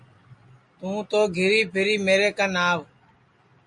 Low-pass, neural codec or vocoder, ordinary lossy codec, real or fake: 10.8 kHz; none; MP3, 48 kbps; real